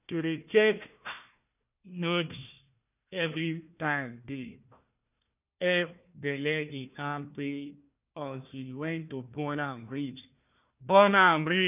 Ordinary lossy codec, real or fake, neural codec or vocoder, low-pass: none; fake; codec, 16 kHz, 1 kbps, FunCodec, trained on Chinese and English, 50 frames a second; 3.6 kHz